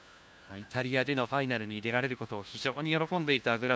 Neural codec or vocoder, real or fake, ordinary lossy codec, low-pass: codec, 16 kHz, 1 kbps, FunCodec, trained on LibriTTS, 50 frames a second; fake; none; none